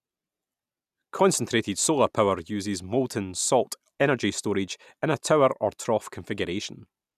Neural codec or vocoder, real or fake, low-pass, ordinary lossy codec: none; real; 14.4 kHz; none